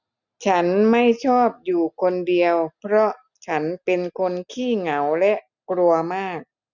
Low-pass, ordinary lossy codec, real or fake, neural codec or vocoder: 7.2 kHz; none; real; none